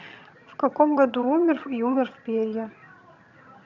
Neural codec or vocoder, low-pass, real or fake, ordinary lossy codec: vocoder, 22.05 kHz, 80 mel bands, HiFi-GAN; 7.2 kHz; fake; none